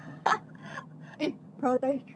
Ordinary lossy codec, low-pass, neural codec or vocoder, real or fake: none; none; vocoder, 22.05 kHz, 80 mel bands, HiFi-GAN; fake